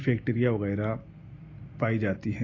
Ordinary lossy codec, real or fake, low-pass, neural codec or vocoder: AAC, 32 kbps; real; 7.2 kHz; none